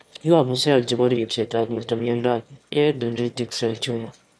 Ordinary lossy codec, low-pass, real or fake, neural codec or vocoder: none; none; fake; autoencoder, 22.05 kHz, a latent of 192 numbers a frame, VITS, trained on one speaker